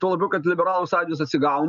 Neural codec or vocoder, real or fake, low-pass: none; real; 7.2 kHz